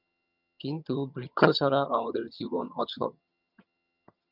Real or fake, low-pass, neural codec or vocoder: fake; 5.4 kHz; vocoder, 22.05 kHz, 80 mel bands, HiFi-GAN